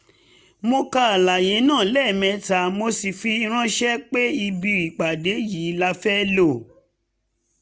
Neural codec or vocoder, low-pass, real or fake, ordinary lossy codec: none; none; real; none